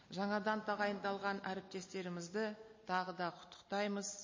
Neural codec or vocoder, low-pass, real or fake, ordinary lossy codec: none; 7.2 kHz; real; MP3, 32 kbps